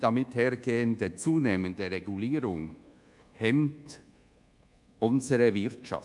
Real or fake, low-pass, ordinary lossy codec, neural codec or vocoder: fake; 10.8 kHz; none; codec, 24 kHz, 1.2 kbps, DualCodec